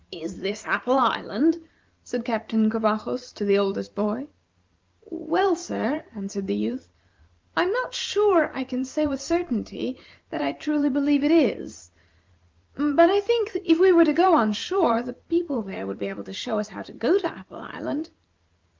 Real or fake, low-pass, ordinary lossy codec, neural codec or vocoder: fake; 7.2 kHz; Opus, 24 kbps; vocoder, 44.1 kHz, 128 mel bands every 512 samples, BigVGAN v2